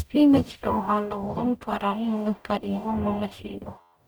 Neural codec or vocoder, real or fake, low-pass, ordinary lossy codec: codec, 44.1 kHz, 0.9 kbps, DAC; fake; none; none